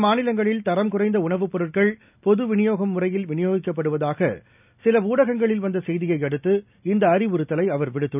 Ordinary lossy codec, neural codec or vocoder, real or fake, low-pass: none; none; real; 3.6 kHz